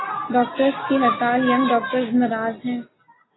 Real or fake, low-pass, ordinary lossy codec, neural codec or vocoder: real; 7.2 kHz; AAC, 16 kbps; none